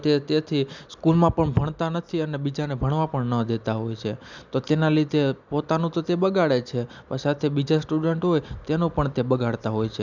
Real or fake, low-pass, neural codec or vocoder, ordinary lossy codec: real; 7.2 kHz; none; none